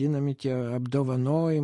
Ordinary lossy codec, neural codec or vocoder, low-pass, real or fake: MP3, 48 kbps; none; 10.8 kHz; real